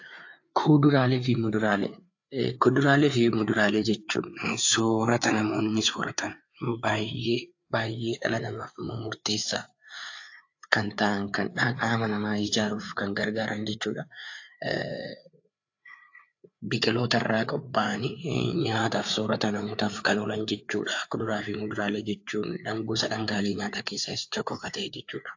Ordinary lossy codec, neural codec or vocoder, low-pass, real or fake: AAC, 48 kbps; codec, 16 kHz, 4 kbps, FreqCodec, larger model; 7.2 kHz; fake